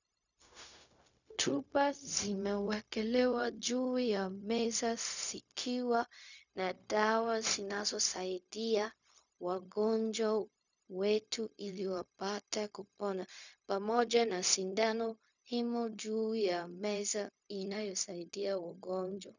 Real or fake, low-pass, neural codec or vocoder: fake; 7.2 kHz; codec, 16 kHz, 0.4 kbps, LongCat-Audio-Codec